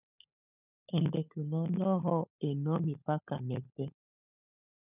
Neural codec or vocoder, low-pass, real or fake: codec, 16 kHz, 16 kbps, FunCodec, trained on LibriTTS, 50 frames a second; 3.6 kHz; fake